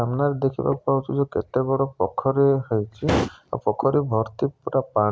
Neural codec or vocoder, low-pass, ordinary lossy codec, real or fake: none; none; none; real